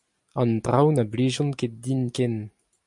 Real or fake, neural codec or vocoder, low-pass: real; none; 10.8 kHz